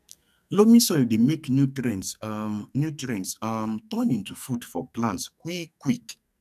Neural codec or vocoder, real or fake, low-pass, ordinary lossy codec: codec, 44.1 kHz, 2.6 kbps, SNAC; fake; 14.4 kHz; none